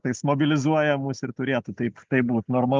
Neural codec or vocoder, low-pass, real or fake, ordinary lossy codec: none; 7.2 kHz; real; Opus, 24 kbps